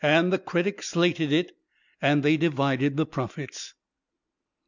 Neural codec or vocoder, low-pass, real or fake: none; 7.2 kHz; real